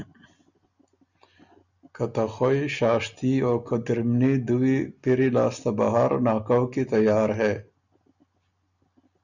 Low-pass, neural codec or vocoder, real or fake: 7.2 kHz; none; real